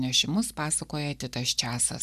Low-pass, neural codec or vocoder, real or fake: 14.4 kHz; none; real